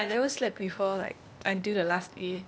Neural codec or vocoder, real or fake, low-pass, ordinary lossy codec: codec, 16 kHz, 0.8 kbps, ZipCodec; fake; none; none